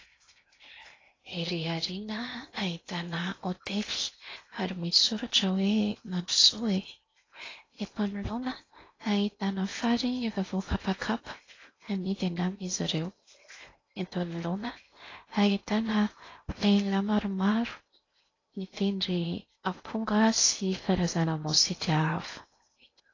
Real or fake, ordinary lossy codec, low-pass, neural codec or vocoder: fake; AAC, 32 kbps; 7.2 kHz; codec, 16 kHz in and 24 kHz out, 0.8 kbps, FocalCodec, streaming, 65536 codes